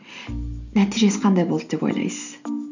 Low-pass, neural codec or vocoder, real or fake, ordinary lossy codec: 7.2 kHz; none; real; none